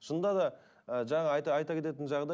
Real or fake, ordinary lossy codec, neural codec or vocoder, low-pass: real; none; none; none